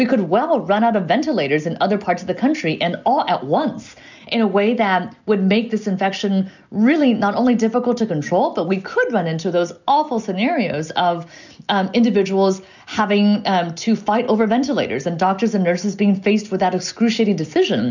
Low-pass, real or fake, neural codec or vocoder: 7.2 kHz; real; none